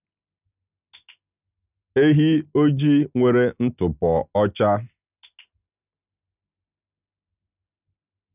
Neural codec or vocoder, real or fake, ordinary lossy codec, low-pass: none; real; none; 3.6 kHz